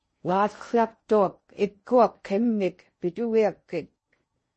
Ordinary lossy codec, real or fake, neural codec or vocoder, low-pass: MP3, 32 kbps; fake; codec, 16 kHz in and 24 kHz out, 0.6 kbps, FocalCodec, streaming, 4096 codes; 10.8 kHz